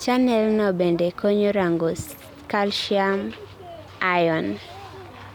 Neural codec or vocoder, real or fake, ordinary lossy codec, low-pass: none; real; none; 19.8 kHz